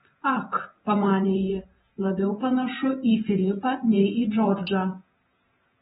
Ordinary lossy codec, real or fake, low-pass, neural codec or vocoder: AAC, 16 kbps; fake; 19.8 kHz; vocoder, 48 kHz, 128 mel bands, Vocos